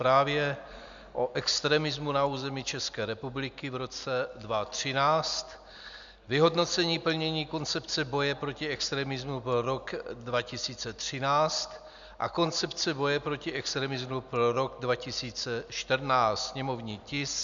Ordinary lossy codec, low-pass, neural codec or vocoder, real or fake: MP3, 96 kbps; 7.2 kHz; none; real